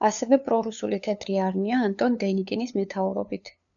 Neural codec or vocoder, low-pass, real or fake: codec, 16 kHz, 2 kbps, FunCodec, trained on LibriTTS, 25 frames a second; 7.2 kHz; fake